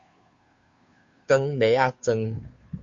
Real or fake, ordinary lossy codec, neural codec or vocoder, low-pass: fake; Opus, 64 kbps; codec, 16 kHz, 2 kbps, FunCodec, trained on Chinese and English, 25 frames a second; 7.2 kHz